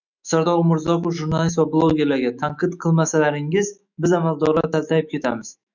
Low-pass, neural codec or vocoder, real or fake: 7.2 kHz; autoencoder, 48 kHz, 128 numbers a frame, DAC-VAE, trained on Japanese speech; fake